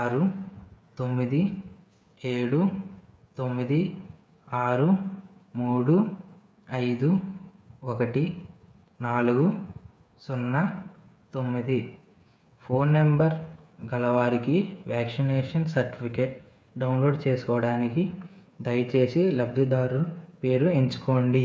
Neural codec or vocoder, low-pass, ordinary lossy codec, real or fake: codec, 16 kHz, 8 kbps, FreqCodec, smaller model; none; none; fake